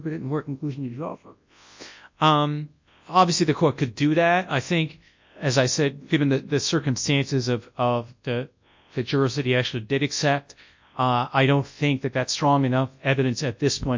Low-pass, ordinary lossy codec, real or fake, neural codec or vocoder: 7.2 kHz; MP3, 64 kbps; fake; codec, 24 kHz, 0.9 kbps, WavTokenizer, large speech release